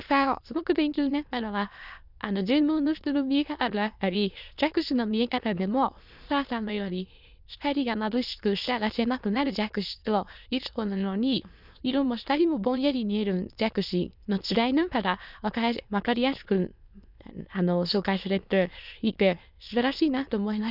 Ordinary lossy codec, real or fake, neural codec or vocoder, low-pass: none; fake; autoencoder, 22.05 kHz, a latent of 192 numbers a frame, VITS, trained on many speakers; 5.4 kHz